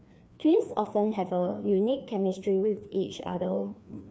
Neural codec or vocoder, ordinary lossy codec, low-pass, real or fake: codec, 16 kHz, 2 kbps, FreqCodec, larger model; none; none; fake